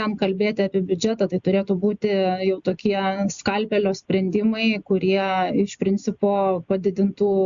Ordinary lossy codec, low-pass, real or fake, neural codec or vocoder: Opus, 64 kbps; 7.2 kHz; real; none